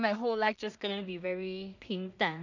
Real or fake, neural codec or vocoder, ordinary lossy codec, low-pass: fake; codec, 16 kHz in and 24 kHz out, 0.4 kbps, LongCat-Audio-Codec, two codebook decoder; none; 7.2 kHz